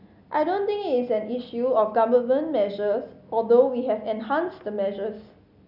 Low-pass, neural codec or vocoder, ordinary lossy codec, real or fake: 5.4 kHz; none; none; real